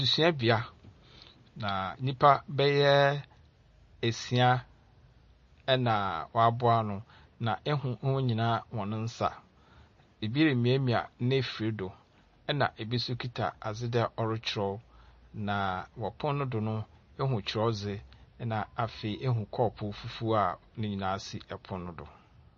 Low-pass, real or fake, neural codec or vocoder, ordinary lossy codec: 7.2 kHz; real; none; MP3, 32 kbps